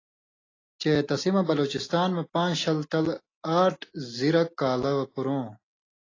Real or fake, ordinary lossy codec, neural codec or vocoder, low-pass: real; AAC, 32 kbps; none; 7.2 kHz